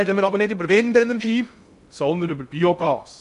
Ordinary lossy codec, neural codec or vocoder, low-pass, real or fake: none; codec, 16 kHz in and 24 kHz out, 0.6 kbps, FocalCodec, streaming, 2048 codes; 10.8 kHz; fake